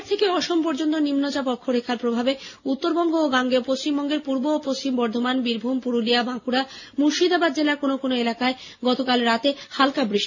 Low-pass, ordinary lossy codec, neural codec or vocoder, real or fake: 7.2 kHz; none; none; real